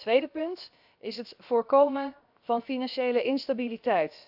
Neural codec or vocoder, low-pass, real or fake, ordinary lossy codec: codec, 16 kHz, 0.7 kbps, FocalCodec; 5.4 kHz; fake; AAC, 48 kbps